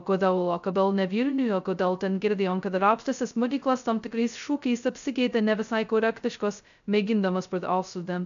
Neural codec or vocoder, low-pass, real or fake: codec, 16 kHz, 0.2 kbps, FocalCodec; 7.2 kHz; fake